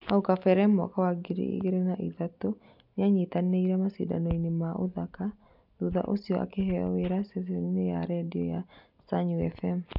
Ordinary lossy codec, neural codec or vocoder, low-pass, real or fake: none; none; 5.4 kHz; real